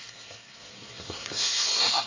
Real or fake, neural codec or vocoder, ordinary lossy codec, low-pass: fake; codec, 24 kHz, 1 kbps, SNAC; AAC, 48 kbps; 7.2 kHz